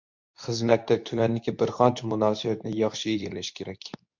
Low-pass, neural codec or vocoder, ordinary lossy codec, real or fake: 7.2 kHz; codec, 24 kHz, 0.9 kbps, WavTokenizer, medium speech release version 2; MP3, 64 kbps; fake